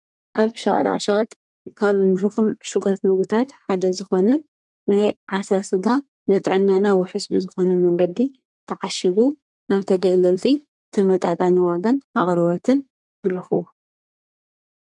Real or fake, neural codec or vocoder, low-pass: fake; codec, 24 kHz, 1 kbps, SNAC; 10.8 kHz